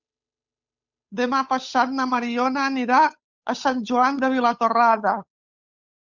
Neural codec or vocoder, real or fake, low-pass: codec, 16 kHz, 8 kbps, FunCodec, trained on Chinese and English, 25 frames a second; fake; 7.2 kHz